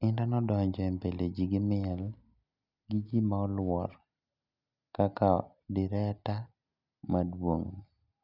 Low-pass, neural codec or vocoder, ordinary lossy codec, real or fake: 5.4 kHz; none; none; real